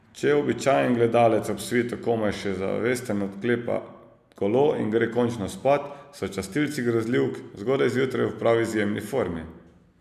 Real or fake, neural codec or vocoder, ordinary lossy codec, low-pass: real; none; MP3, 96 kbps; 14.4 kHz